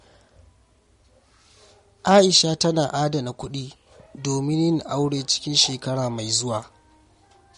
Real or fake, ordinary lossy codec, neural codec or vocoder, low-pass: real; MP3, 48 kbps; none; 10.8 kHz